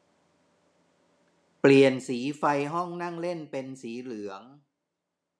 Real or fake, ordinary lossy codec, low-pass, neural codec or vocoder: real; none; none; none